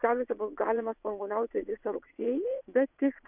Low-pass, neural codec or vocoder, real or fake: 3.6 kHz; vocoder, 22.05 kHz, 80 mel bands, WaveNeXt; fake